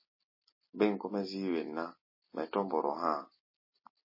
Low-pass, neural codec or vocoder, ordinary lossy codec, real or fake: 5.4 kHz; none; MP3, 24 kbps; real